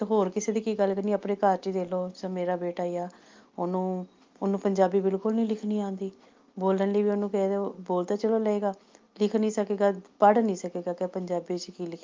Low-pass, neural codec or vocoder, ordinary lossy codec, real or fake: 7.2 kHz; none; Opus, 32 kbps; real